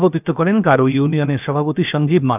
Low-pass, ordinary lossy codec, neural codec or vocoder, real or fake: 3.6 kHz; none; codec, 16 kHz, 0.7 kbps, FocalCodec; fake